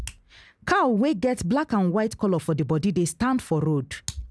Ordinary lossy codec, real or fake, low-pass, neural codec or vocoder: none; real; none; none